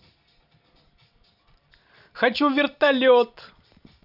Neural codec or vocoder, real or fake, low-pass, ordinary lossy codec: vocoder, 44.1 kHz, 128 mel bands every 256 samples, BigVGAN v2; fake; 5.4 kHz; none